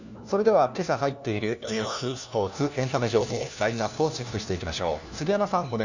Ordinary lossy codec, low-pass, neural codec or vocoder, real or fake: none; 7.2 kHz; codec, 16 kHz, 1 kbps, FunCodec, trained on LibriTTS, 50 frames a second; fake